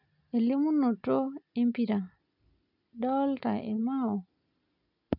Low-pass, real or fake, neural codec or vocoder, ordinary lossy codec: 5.4 kHz; real; none; none